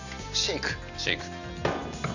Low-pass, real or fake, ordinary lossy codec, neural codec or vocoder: 7.2 kHz; real; none; none